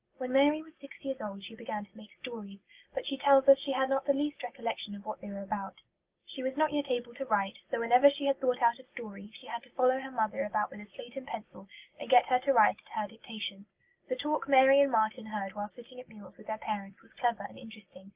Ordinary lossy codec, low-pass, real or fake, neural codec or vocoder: Opus, 24 kbps; 3.6 kHz; real; none